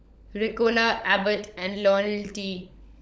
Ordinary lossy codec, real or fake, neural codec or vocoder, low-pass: none; fake; codec, 16 kHz, 16 kbps, FunCodec, trained on LibriTTS, 50 frames a second; none